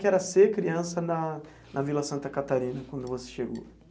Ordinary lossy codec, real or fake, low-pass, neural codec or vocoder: none; real; none; none